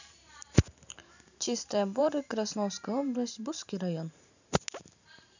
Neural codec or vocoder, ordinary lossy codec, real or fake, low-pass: vocoder, 44.1 kHz, 128 mel bands every 512 samples, BigVGAN v2; none; fake; 7.2 kHz